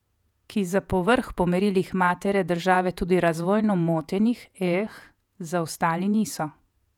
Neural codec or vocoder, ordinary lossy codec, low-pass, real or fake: vocoder, 48 kHz, 128 mel bands, Vocos; none; 19.8 kHz; fake